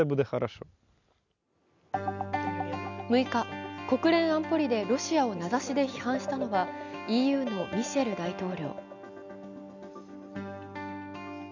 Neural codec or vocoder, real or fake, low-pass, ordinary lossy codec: none; real; 7.2 kHz; none